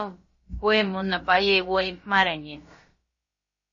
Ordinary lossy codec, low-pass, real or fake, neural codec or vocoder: MP3, 32 kbps; 7.2 kHz; fake; codec, 16 kHz, about 1 kbps, DyCAST, with the encoder's durations